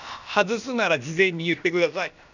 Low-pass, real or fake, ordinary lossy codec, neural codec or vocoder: 7.2 kHz; fake; none; codec, 16 kHz, about 1 kbps, DyCAST, with the encoder's durations